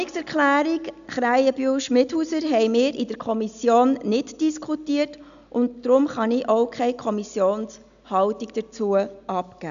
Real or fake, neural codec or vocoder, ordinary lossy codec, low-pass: real; none; none; 7.2 kHz